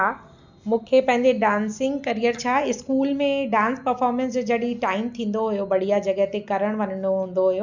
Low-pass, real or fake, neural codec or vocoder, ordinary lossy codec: 7.2 kHz; real; none; none